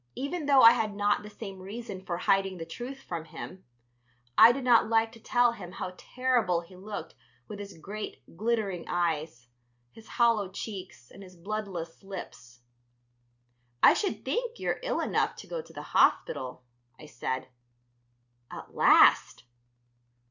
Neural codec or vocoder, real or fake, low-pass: none; real; 7.2 kHz